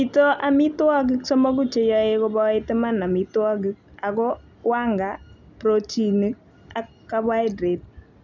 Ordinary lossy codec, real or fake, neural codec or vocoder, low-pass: none; real; none; 7.2 kHz